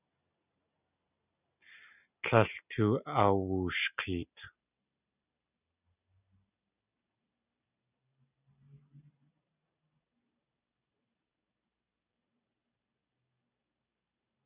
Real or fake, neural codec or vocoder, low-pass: real; none; 3.6 kHz